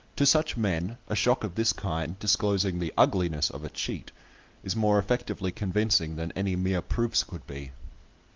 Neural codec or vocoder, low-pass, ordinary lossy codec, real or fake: none; 7.2 kHz; Opus, 24 kbps; real